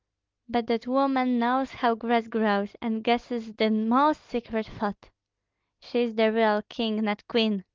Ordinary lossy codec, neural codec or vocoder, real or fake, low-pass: Opus, 32 kbps; none; real; 7.2 kHz